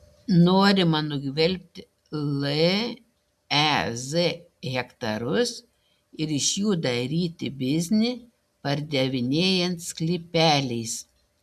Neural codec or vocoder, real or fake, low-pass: none; real; 14.4 kHz